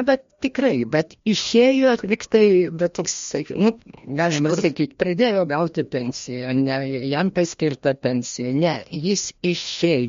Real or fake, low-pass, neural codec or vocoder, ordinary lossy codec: fake; 7.2 kHz; codec, 16 kHz, 1 kbps, FreqCodec, larger model; MP3, 48 kbps